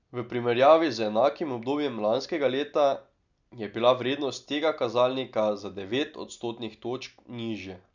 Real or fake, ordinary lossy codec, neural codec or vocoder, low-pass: real; none; none; 7.2 kHz